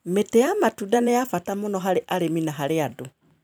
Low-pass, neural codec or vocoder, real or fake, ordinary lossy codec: none; vocoder, 44.1 kHz, 128 mel bands every 256 samples, BigVGAN v2; fake; none